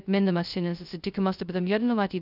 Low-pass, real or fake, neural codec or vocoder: 5.4 kHz; fake; codec, 16 kHz, 0.2 kbps, FocalCodec